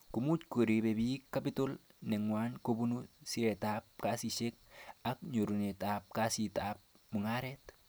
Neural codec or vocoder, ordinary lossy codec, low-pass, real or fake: none; none; none; real